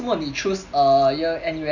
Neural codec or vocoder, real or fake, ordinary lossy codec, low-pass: none; real; none; 7.2 kHz